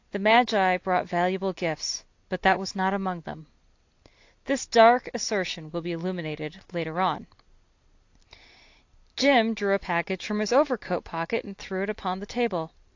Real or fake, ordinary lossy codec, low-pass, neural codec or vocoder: real; AAC, 48 kbps; 7.2 kHz; none